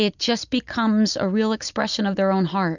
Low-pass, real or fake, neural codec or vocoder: 7.2 kHz; real; none